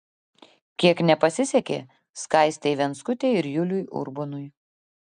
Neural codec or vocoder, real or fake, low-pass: none; real; 9.9 kHz